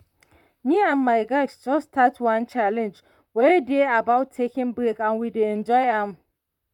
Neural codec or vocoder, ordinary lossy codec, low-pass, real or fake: vocoder, 44.1 kHz, 128 mel bands, Pupu-Vocoder; none; 19.8 kHz; fake